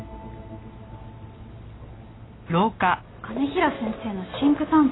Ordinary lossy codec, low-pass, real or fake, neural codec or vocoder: AAC, 16 kbps; 7.2 kHz; real; none